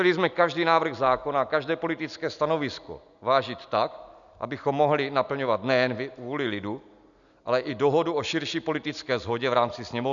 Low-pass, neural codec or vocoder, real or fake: 7.2 kHz; none; real